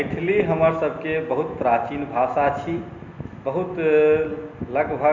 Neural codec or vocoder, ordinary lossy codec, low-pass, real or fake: none; none; 7.2 kHz; real